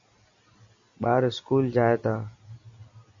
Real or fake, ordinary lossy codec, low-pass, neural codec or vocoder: real; AAC, 64 kbps; 7.2 kHz; none